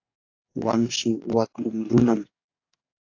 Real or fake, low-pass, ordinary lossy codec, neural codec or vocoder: fake; 7.2 kHz; AAC, 48 kbps; codec, 44.1 kHz, 2.6 kbps, DAC